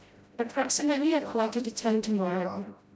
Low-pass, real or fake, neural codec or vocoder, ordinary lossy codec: none; fake; codec, 16 kHz, 0.5 kbps, FreqCodec, smaller model; none